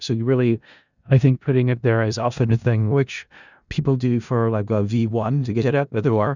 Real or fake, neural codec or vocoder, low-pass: fake; codec, 16 kHz in and 24 kHz out, 0.4 kbps, LongCat-Audio-Codec, four codebook decoder; 7.2 kHz